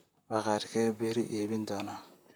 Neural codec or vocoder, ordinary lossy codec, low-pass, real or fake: vocoder, 44.1 kHz, 128 mel bands, Pupu-Vocoder; none; none; fake